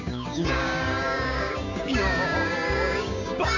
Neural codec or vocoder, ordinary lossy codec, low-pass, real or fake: codec, 16 kHz, 6 kbps, DAC; none; 7.2 kHz; fake